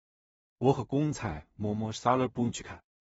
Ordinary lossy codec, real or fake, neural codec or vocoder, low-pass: AAC, 24 kbps; fake; codec, 16 kHz in and 24 kHz out, 0.4 kbps, LongCat-Audio-Codec, two codebook decoder; 10.8 kHz